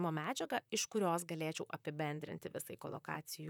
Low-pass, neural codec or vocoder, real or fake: 19.8 kHz; vocoder, 44.1 kHz, 128 mel bands, Pupu-Vocoder; fake